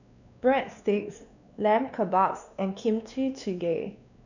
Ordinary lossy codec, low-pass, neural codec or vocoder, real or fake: none; 7.2 kHz; codec, 16 kHz, 2 kbps, X-Codec, WavLM features, trained on Multilingual LibriSpeech; fake